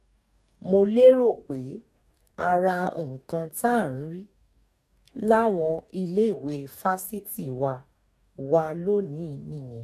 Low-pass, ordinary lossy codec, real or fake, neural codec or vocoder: 14.4 kHz; none; fake; codec, 44.1 kHz, 2.6 kbps, DAC